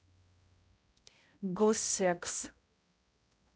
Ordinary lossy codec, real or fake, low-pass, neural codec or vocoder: none; fake; none; codec, 16 kHz, 0.5 kbps, X-Codec, HuBERT features, trained on balanced general audio